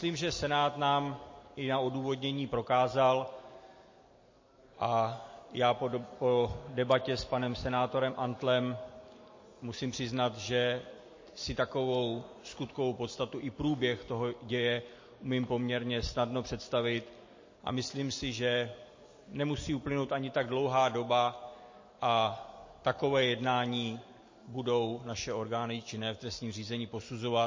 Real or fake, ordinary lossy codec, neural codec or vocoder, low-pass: real; MP3, 32 kbps; none; 7.2 kHz